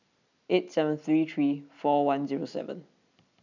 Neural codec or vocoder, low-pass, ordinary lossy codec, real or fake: none; 7.2 kHz; none; real